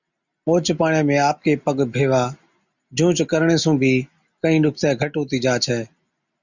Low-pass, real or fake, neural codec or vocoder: 7.2 kHz; real; none